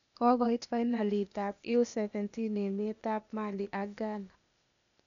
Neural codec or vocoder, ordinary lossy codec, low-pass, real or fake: codec, 16 kHz, 0.8 kbps, ZipCodec; none; 7.2 kHz; fake